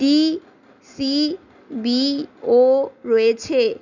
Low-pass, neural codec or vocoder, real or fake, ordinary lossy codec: 7.2 kHz; none; real; none